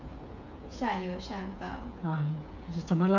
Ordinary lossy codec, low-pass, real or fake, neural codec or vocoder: none; 7.2 kHz; fake; codec, 16 kHz, 8 kbps, FreqCodec, smaller model